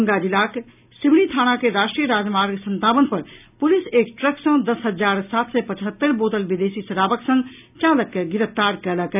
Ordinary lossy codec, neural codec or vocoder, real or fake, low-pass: none; none; real; 3.6 kHz